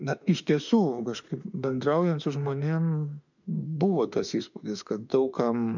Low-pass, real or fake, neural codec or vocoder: 7.2 kHz; fake; autoencoder, 48 kHz, 32 numbers a frame, DAC-VAE, trained on Japanese speech